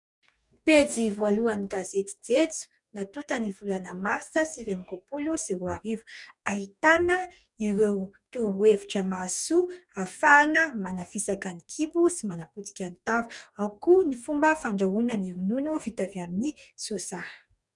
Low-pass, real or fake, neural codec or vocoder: 10.8 kHz; fake; codec, 44.1 kHz, 2.6 kbps, DAC